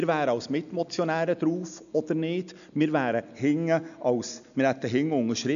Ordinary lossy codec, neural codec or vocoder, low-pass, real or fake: none; none; 7.2 kHz; real